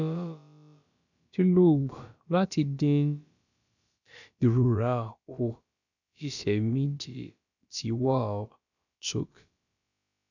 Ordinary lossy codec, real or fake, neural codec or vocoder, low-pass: none; fake; codec, 16 kHz, about 1 kbps, DyCAST, with the encoder's durations; 7.2 kHz